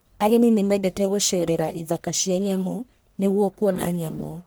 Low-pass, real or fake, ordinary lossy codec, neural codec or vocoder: none; fake; none; codec, 44.1 kHz, 1.7 kbps, Pupu-Codec